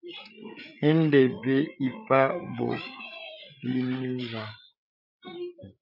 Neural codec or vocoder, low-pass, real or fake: codec, 16 kHz, 8 kbps, FreqCodec, larger model; 5.4 kHz; fake